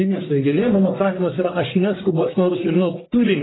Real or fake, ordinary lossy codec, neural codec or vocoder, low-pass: fake; AAC, 16 kbps; codec, 44.1 kHz, 1.7 kbps, Pupu-Codec; 7.2 kHz